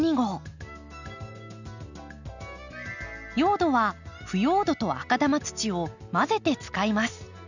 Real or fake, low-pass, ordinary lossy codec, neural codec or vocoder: real; 7.2 kHz; none; none